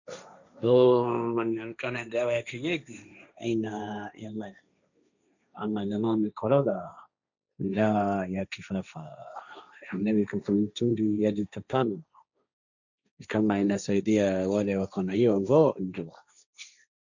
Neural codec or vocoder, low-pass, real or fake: codec, 16 kHz, 1.1 kbps, Voila-Tokenizer; 7.2 kHz; fake